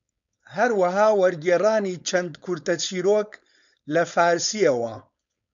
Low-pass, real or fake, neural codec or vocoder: 7.2 kHz; fake; codec, 16 kHz, 4.8 kbps, FACodec